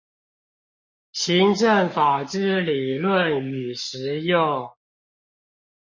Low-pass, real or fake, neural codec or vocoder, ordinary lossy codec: 7.2 kHz; fake; codec, 44.1 kHz, 7.8 kbps, Pupu-Codec; MP3, 32 kbps